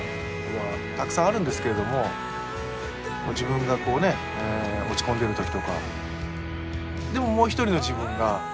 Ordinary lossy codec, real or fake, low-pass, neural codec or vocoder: none; real; none; none